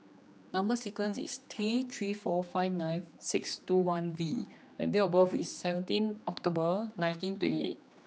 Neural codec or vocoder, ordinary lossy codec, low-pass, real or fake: codec, 16 kHz, 2 kbps, X-Codec, HuBERT features, trained on general audio; none; none; fake